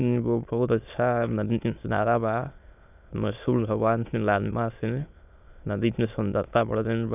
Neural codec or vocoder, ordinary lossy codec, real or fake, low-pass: autoencoder, 22.05 kHz, a latent of 192 numbers a frame, VITS, trained on many speakers; none; fake; 3.6 kHz